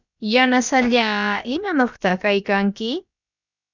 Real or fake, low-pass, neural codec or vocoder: fake; 7.2 kHz; codec, 16 kHz, about 1 kbps, DyCAST, with the encoder's durations